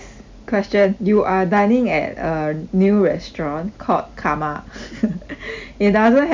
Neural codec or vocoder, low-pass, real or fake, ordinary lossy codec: none; 7.2 kHz; real; MP3, 64 kbps